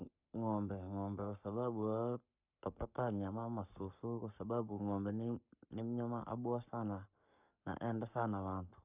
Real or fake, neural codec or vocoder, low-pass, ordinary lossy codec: fake; codec, 44.1 kHz, 7.8 kbps, Pupu-Codec; 3.6 kHz; none